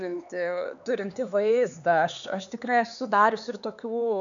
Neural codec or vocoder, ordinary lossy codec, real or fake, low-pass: codec, 16 kHz, 4 kbps, X-Codec, HuBERT features, trained on LibriSpeech; MP3, 96 kbps; fake; 7.2 kHz